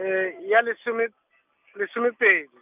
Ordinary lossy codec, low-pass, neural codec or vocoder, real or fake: none; 3.6 kHz; none; real